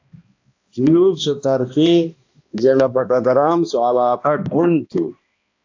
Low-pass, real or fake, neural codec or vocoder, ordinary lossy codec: 7.2 kHz; fake; codec, 16 kHz, 1 kbps, X-Codec, HuBERT features, trained on balanced general audio; AAC, 48 kbps